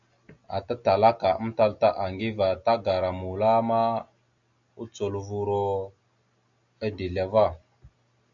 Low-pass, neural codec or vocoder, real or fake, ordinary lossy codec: 7.2 kHz; none; real; MP3, 64 kbps